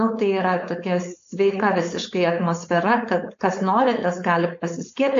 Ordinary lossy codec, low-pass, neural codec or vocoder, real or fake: AAC, 48 kbps; 7.2 kHz; codec, 16 kHz, 4.8 kbps, FACodec; fake